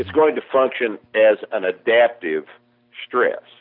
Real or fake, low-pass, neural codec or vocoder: real; 5.4 kHz; none